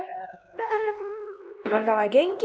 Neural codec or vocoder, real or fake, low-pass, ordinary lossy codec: codec, 16 kHz, 1 kbps, X-Codec, HuBERT features, trained on LibriSpeech; fake; none; none